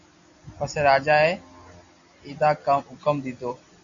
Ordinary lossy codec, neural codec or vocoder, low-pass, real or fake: Opus, 64 kbps; none; 7.2 kHz; real